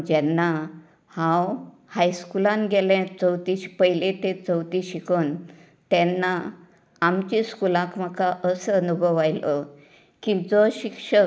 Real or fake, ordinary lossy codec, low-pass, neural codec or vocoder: real; none; none; none